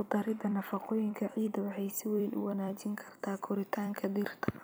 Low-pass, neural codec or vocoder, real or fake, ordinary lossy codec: none; vocoder, 44.1 kHz, 128 mel bands every 256 samples, BigVGAN v2; fake; none